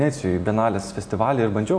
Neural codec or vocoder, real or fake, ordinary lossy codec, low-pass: none; real; AAC, 64 kbps; 9.9 kHz